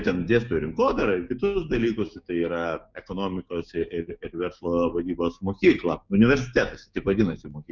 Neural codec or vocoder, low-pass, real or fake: codec, 16 kHz, 6 kbps, DAC; 7.2 kHz; fake